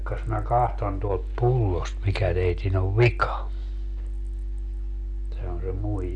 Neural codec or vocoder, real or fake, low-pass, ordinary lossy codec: none; real; 9.9 kHz; none